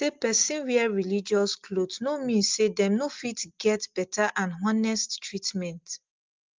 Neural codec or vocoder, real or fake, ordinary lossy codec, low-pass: none; real; Opus, 24 kbps; 7.2 kHz